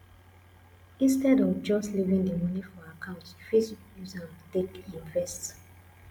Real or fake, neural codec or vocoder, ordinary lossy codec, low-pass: real; none; MP3, 96 kbps; 19.8 kHz